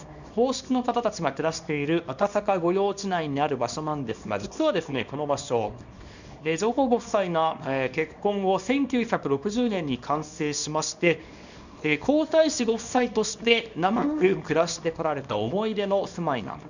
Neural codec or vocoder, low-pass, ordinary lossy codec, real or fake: codec, 24 kHz, 0.9 kbps, WavTokenizer, small release; 7.2 kHz; none; fake